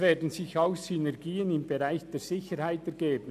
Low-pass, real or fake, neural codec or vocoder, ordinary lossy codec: 14.4 kHz; real; none; none